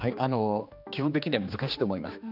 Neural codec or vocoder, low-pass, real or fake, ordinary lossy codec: codec, 16 kHz, 2 kbps, X-Codec, HuBERT features, trained on general audio; 5.4 kHz; fake; none